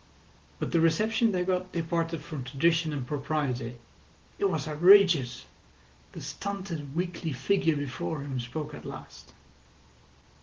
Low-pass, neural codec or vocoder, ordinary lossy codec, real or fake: 7.2 kHz; none; Opus, 16 kbps; real